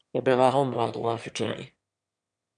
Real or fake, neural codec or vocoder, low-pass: fake; autoencoder, 22.05 kHz, a latent of 192 numbers a frame, VITS, trained on one speaker; 9.9 kHz